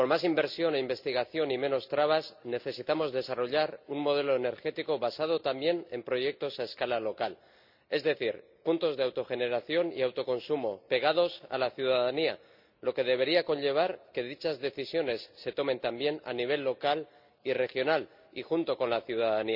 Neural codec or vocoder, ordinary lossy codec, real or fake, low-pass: none; none; real; 5.4 kHz